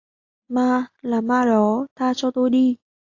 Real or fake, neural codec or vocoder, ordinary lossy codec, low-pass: real; none; AAC, 48 kbps; 7.2 kHz